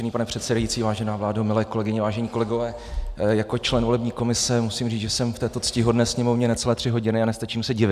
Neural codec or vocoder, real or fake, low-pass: none; real; 14.4 kHz